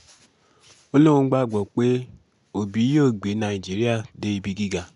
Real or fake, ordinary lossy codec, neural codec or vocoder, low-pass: real; none; none; 10.8 kHz